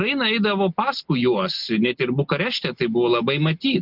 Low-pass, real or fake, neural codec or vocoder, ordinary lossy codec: 5.4 kHz; real; none; Opus, 24 kbps